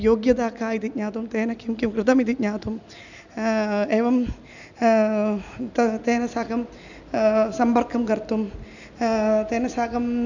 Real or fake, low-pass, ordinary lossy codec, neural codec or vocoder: real; 7.2 kHz; none; none